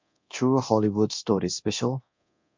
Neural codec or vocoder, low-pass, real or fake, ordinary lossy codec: codec, 24 kHz, 0.9 kbps, DualCodec; 7.2 kHz; fake; MP3, 64 kbps